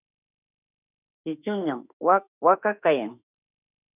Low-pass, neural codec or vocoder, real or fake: 3.6 kHz; autoencoder, 48 kHz, 32 numbers a frame, DAC-VAE, trained on Japanese speech; fake